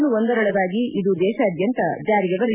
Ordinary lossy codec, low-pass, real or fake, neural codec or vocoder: none; 3.6 kHz; real; none